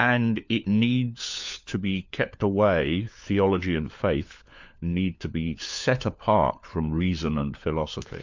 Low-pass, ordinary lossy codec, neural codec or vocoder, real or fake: 7.2 kHz; MP3, 64 kbps; codec, 16 kHz, 4 kbps, FunCodec, trained on LibriTTS, 50 frames a second; fake